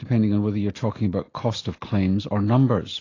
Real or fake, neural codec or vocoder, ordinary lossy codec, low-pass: real; none; AAC, 32 kbps; 7.2 kHz